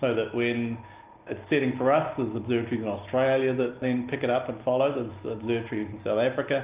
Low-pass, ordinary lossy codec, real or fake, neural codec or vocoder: 3.6 kHz; Opus, 24 kbps; real; none